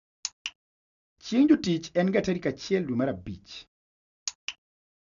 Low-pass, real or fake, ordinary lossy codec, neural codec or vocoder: 7.2 kHz; real; none; none